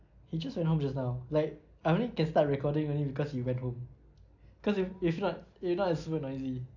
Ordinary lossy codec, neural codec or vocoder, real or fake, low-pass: none; none; real; 7.2 kHz